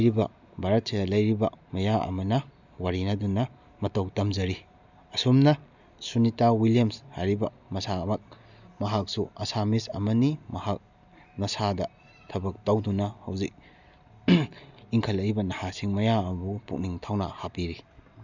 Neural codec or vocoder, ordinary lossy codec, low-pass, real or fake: none; none; 7.2 kHz; real